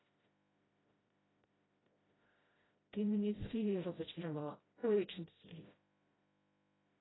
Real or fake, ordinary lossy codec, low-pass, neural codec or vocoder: fake; AAC, 16 kbps; 7.2 kHz; codec, 16 kHz, 0.5 kbps, FreqCodec, smaller model